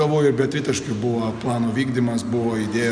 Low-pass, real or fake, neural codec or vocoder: 9.9 kHz; real; none